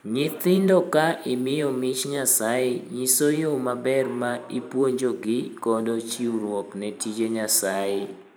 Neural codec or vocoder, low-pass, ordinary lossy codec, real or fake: vocoder, 44.1 kHz, 128 mel bands every 512 samples, BigVGAN v2; none; none; fake